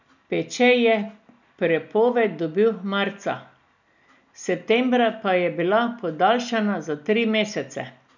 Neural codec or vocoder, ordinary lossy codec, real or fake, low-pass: none; none; real; 7.2 kHz